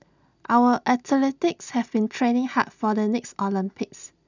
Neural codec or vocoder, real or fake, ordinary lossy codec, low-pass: none; real; none; 7.2 kHz